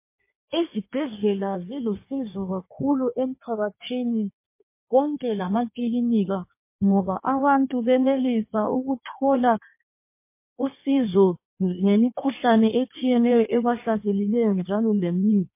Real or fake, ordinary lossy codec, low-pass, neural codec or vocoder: fake; MP3, 24 kbps; 3.6 kHz; codec, 16 kHz in and 24 kHz out, 1.1 kbps, FireRedTTS-2 codec